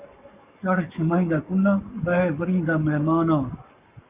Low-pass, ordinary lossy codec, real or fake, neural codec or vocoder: 3.6 kHz; Opus, 64 kbps; fake; codec, 44.1 kHz, 7.8 kbps, Pupu-Codec